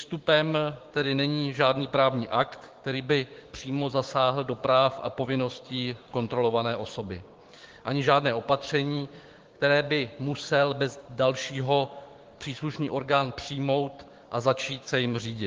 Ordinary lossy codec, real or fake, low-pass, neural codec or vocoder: Opus, 16 kbps; fake; 7.2 kHz; codec, 16 kHz, 6 kbps, DAC